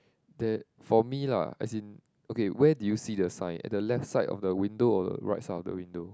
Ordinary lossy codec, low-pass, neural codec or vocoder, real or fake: none; none; none; real